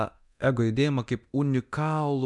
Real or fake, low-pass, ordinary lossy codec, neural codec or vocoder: fake; 10.8 kHz; MP3, 96 kbps; codec, 24 kHz, 0.9 kbps, DualCodec